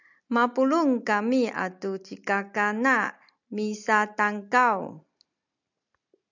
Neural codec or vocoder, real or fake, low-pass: none; real; 7.2 kHz